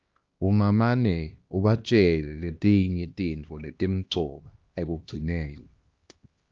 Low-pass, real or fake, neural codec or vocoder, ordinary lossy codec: 7.2 kHz; fake; codec, 16 kHz, 1 kbps, X-Codec, HuBERT features, trained on LibriSpeech; Opus, 32 kbps